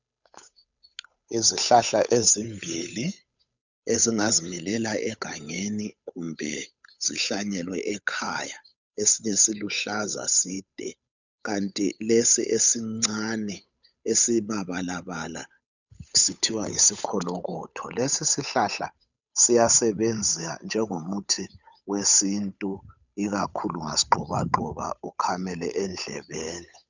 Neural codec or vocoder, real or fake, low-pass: codec, 16 kHz, 8 kbps, FunCodec, trained on Chinese and English, 25 frames a second; fake; 7.2 kHz